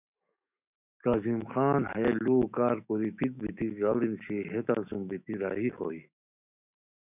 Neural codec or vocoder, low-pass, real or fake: autoencoder, 48 kHz, 128 numbers a frame, DAC-VAE, trained on Japanese speech; 3.6 kHz; fake